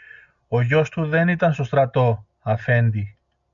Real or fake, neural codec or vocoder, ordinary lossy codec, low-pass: real; none; MP3, 64 kbps; 7.2 kHz